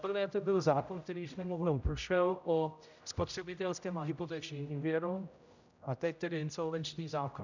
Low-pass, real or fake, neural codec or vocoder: 7.2 kHz; fake; codec, 16 kHz, 0.5 kbps, X-Codec, HuBERT features, trained on general audio